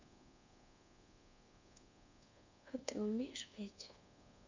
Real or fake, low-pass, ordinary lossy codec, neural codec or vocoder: fake; 7.2 kHz; MP3, 64 kbps; codec, 24 kHz, 1.2 kbps, DualCodec